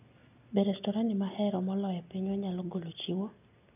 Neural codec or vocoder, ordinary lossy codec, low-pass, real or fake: none; none; 3.6 kHz; real